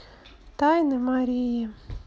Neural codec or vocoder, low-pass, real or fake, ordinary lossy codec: none; none; real; none